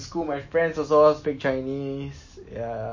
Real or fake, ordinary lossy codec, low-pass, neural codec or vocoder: real; MP3, 32 kbps; 7.2 kHz; none